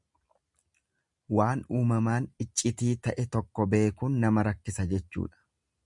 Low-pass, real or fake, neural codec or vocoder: 10.8 kHz; real; none